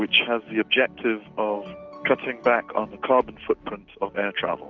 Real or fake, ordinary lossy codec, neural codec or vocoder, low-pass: real; Opus, 32 kbps; none; 7.2 kHz